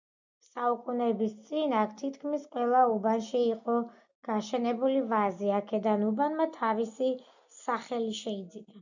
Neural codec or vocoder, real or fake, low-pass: none; real; 7.2 kHz